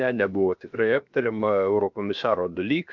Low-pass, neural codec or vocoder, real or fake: 7.2 kHz; codec, 16 kHz, 0.7 kbps, FocalCodec; fake